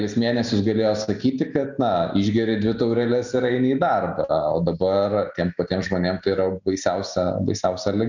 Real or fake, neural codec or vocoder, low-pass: real; none; 7.2 kHz